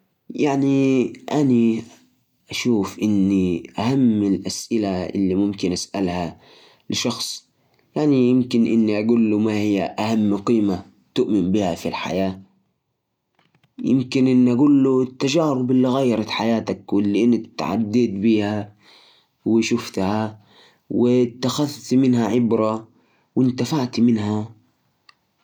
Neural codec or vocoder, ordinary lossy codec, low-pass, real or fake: none; none; 19.8 kHz; real